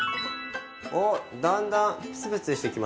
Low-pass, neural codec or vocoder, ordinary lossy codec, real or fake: none; none; none; real